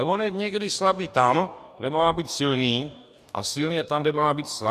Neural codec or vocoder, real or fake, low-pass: codec, 44.1 kHz, 2.6 kbps, DAC; fake; 14.4 kHz